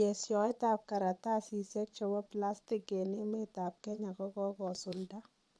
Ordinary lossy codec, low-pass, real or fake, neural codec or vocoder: none; none; fake; vocoder, 22.05 kHz, 80 mel bands, Vocos